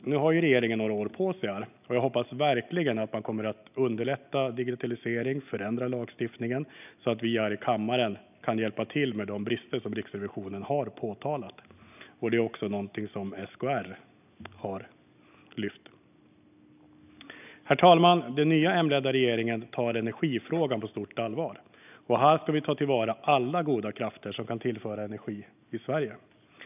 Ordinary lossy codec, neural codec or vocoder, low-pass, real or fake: none; none; 3.6 kHz; real